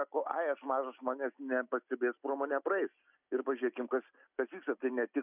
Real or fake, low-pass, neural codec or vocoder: real; 3.6 kHz; none